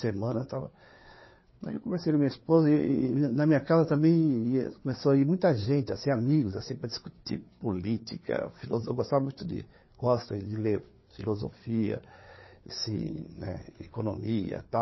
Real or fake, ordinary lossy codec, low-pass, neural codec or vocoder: fake; MP3, 24 kbps; 7.2 kHz; codec, 16 kHz, 4 kbps, FreqCodec, larger model